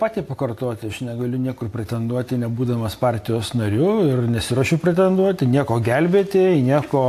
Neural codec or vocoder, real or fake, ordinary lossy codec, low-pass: none; real; AAC, 64 kbps; 14.4 kHz